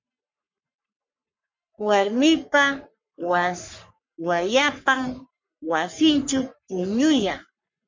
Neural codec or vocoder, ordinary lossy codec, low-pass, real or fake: codec, 44.1 kHz, 3.4 kbps, Pupu-Codec; MP3, 64 kbps; 7.2 kHz; fake